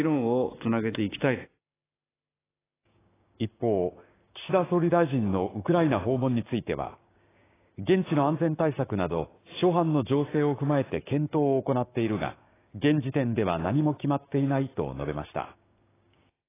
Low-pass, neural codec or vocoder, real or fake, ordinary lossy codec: 3.6 kHz; codec, 16 kHz, 6 kbps, DAC; fake; AAC, 16 kbps